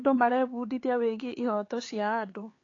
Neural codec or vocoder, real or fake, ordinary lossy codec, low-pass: codec, 16 kHz, 4 kbps, X-Codec, HuBERT features, trained on LibriSpeech; fake; AAC, 32 kbps; 7.2 kHz